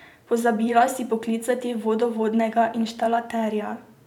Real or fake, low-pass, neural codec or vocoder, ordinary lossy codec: fake; 19.8 kHz; vocoder, 44.1 kHz, 128 mel bands every 512 samples, BigVGAN v2; none